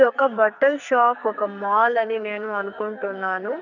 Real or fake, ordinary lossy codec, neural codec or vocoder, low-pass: fake; none; codec, 44.1 kHz, 2.6 kbps, SNAC; 7.2 kHz